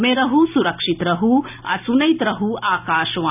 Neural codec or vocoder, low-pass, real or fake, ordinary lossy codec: none; 3.6 kHz; real; none